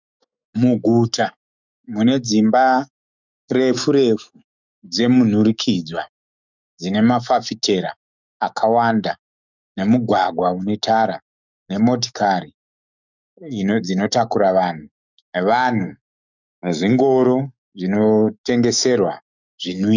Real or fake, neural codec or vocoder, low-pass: fake; autoencoder, 48 kHz, 128 numbers a frame, DAC-VAE, trained on Japanese speech; 7.2 kHz